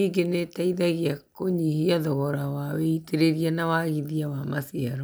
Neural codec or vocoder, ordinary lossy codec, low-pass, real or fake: none; none; none; real